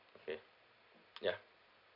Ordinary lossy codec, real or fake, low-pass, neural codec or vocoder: none; real; 5.4 kHz; none